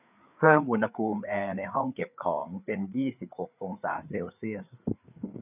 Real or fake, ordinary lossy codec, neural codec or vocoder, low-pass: fake; none; codec, 16 kHz, 4 kbps, FreqCodec, larger model; 3.6 kHz